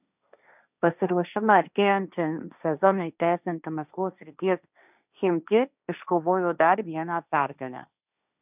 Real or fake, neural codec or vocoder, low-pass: fake; codec, 16 kHz, 1.1 kbps, Voila-Tokenizer; 3.6 kHz